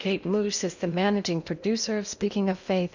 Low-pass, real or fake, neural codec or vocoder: 7.2 kHz; fake; codec, 16 kHz in and 24 kHz out, 0.8 kbps, FocalCodec, streaming, 65536 codes